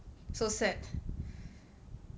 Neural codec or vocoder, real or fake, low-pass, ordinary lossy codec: none; real; none; none